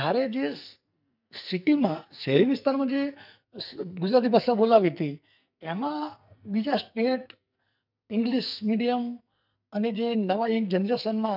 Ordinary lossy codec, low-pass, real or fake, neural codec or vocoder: none; 5.4 kHz; fake; codec, 44.1 kHz, 2.6 kbps, SNAC